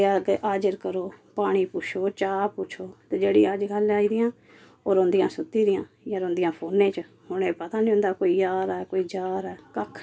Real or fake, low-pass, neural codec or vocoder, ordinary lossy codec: real; none; none; none